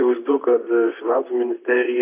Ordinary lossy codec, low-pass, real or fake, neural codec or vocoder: AAC, 24 kbps; 3.6 kHz; fake; vocoder, 44.1 kHz, 128 mel bands, Pupu-Vocoder